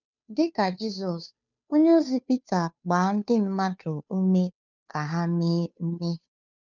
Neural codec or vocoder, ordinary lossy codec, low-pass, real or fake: codec, 16 kHz, 2 kbps, FunCodec, trained on Chinese and English, 25 frames a second; none; 7.2 kHz; fake